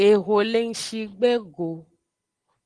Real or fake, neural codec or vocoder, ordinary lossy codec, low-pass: real; none; Opus, 16 kbps; 9.9 kHz